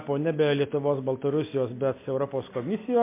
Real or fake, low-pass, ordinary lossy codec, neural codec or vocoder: real; 3.6 kHz; MP3, 24 kbps; none